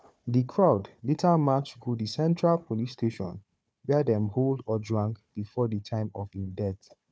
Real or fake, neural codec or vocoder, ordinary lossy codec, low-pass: fake; codec, 16 kHz, 4 kbps, FunCodec, trained on Chinese and English, 50 frames a second; none; none